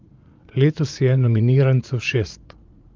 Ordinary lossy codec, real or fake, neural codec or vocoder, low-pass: Opus, 24 kbps; fake; vocoder, 44.1 kHz, 80 mel bands, Vocos; 7.2 kHz